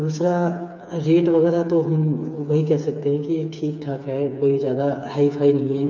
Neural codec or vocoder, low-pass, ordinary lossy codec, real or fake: codec, 16 kHz, 4 kbps, FreqCodec, smaller model; 7.2 kHz; none; fake